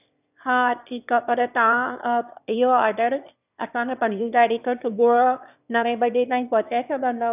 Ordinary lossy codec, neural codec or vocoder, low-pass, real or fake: none; autoencoder, 22.05 kHz, a latent of 192 numbers a frame, VITS, trained on one speaker; 3.6 kHz; fake